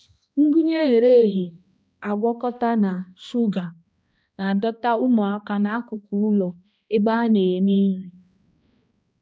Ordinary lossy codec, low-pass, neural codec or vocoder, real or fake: none; none; codec, 16 kHz, 1 kbps, X-Codec, HuBERT features, trained on balanced general audio; fake